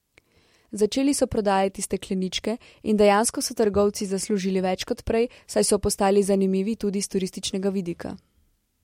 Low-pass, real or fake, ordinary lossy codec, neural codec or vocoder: 19.8 kHz; real; MP3, 64 kbps; none